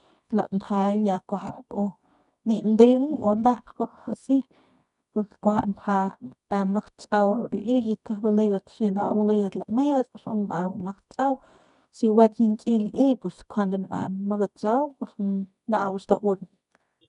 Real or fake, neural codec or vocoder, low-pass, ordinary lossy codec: fake; codec, 24 kHz, 0.9 kbps, WavTokenizer, medium music audio release; 10.8 kHz; none